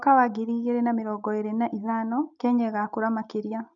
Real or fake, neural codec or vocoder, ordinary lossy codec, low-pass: real; none; none; 7.2 kHz